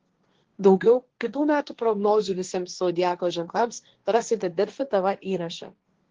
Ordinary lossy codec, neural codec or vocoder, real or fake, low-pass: Opus, 16 kbps; codec, 16 kHz, 1.1 kbps, Voila-Tokenizer; fake; 7.2 kHz